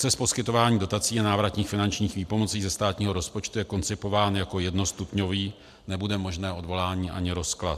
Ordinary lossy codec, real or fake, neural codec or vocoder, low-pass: MP3, 96 kbps; real; none; 14.4 kHz